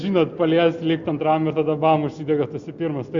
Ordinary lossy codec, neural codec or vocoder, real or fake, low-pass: Opus, 64 kbps; none; real; 7.2 kHz